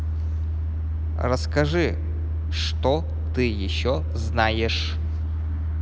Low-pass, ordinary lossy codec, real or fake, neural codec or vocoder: none; none; real; none